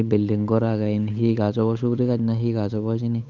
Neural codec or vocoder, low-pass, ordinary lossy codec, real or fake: codec, 16 kHz, 8 kbps, FunCodec, trained on Chinese and English, 25 frames a second; 7.2 kHz; none; fake